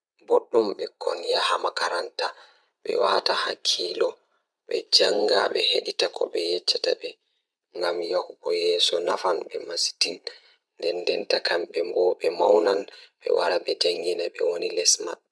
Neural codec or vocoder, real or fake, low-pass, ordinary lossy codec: vocoder, 22.05 kHz, 80 mel bands, Vocos; fake; none; none